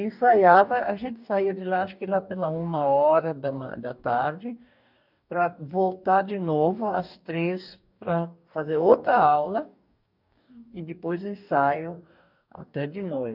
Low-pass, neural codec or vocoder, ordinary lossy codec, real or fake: 5.4 kHz; codec, 44.1 kHz, 2.6 kbps, DAC; none; fake